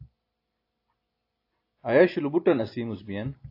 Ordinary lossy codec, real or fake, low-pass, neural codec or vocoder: AAC, 32 kbps; real; 5.4 kHz; none